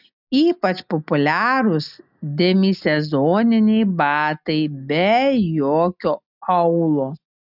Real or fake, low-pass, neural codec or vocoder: real; 5.4 kHz; none